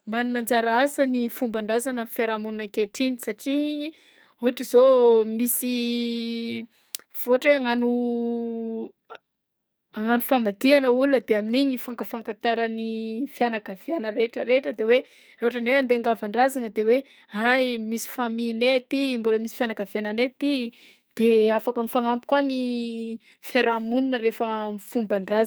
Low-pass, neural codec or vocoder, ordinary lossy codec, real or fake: none; codec, 44.1 kHz, 2.6 kbps, SNAC; none; fake